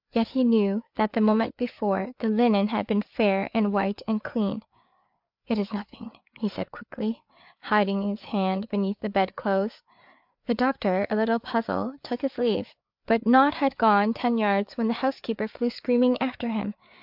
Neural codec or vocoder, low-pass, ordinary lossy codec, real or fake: codec, 16 kHz, 4 kbps, FreqCodec, larger model; 5.4 kHz; MP3, 48 kbps; fake